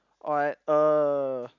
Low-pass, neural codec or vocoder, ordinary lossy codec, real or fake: 7.2 kHz; none; none; real